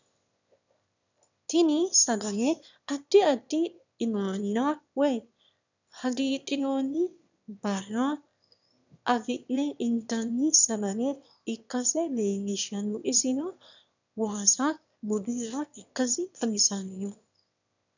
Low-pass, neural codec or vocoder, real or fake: 7.2 kHz; autoencoder, 22.05 kHz, a latent of 192 numbers a frame, VITS, trained on one speaker; fake